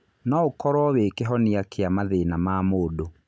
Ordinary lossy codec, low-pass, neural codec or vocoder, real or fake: none; none; none; real